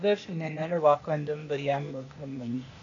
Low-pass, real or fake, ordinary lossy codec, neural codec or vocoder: 7.2 kHz; fake; AAC, 48 kbps; codec, 16 kHz, 0.8 kbps, ZipCodec